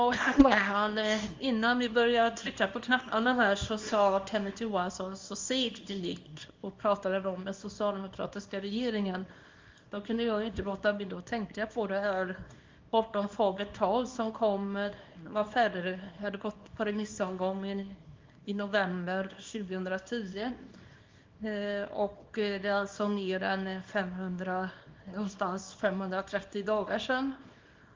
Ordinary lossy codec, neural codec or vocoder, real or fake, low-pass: Opus, 32 kbps; codec, 24 kHz, 0.9 kbps, WavTokenizer, small release; fake; 7.2 kHz